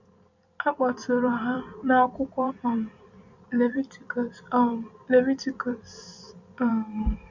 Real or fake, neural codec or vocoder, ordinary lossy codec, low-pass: fake; vocoder, 44.1 kHz, 128 mel bands every 256 samples, BigVGAN v2; none; 7.2 kHz